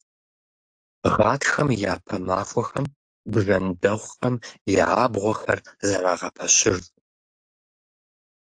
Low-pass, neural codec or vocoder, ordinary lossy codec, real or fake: 9.9 kHz; codec, 44.1 kHz, 7.8 kbps, DAC; AAC, 64 kbps; fake